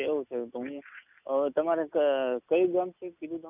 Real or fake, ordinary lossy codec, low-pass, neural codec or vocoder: real; none; 3.6 kHz; none